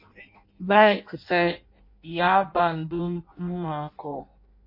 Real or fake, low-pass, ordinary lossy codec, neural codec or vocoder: fake; 5.4 kHz; MP3, 32 kbps; codec, 16 kHz in and 24 kHz out, 0.6 kbps, FireRedTTS-2 codec